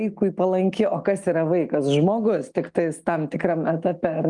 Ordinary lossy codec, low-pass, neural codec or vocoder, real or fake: Opus, 32 kbps; 10.8 kHz; none; real